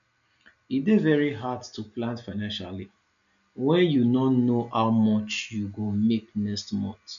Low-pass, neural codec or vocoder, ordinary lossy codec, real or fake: 7.2 kHz; none; none; real